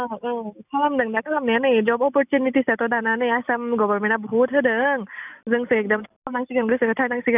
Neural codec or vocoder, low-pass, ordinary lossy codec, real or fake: none; 3.6 kHz; none; real